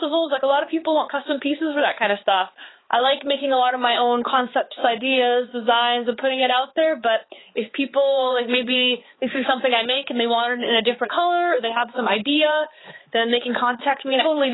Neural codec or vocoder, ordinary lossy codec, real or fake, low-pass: codec, 16 kHz, 4 kbps, X-Codec, HuBERT features, trained on balanced general audio; AAC, 16 kbps; fake; 7.2 kHz